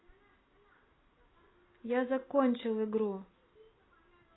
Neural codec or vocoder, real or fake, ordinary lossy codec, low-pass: none; real; AAC, 16 kbps; 7.2 kHz